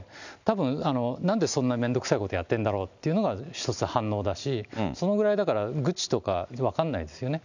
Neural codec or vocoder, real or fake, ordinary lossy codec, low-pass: none; real; none; 7.2 kHz